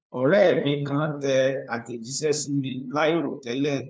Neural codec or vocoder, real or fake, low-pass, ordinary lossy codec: codec, 16 kHz, 2 kbps, FunCodec, trained on LibriTTS, 25 frames a second; fake; none; none